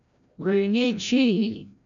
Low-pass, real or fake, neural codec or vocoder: 7.2 kHz; fake; codec, 16 kHz, 0.5 kbps, FreqCodec, larger model